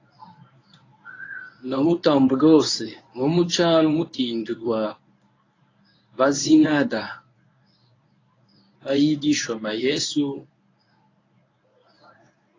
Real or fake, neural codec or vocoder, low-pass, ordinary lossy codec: fake; codec, 24 kHz, 0.9 kbps, WavTokenizer, medium speech release version 1; 7.2 kHz; AAC, 32 kbps